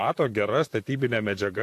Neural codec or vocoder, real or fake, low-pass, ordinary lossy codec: vocoder, 44.1 kHz, 128 mel bands, Pupu-Vocoder; fake; 14.4 kHz; AAC, 64 kbps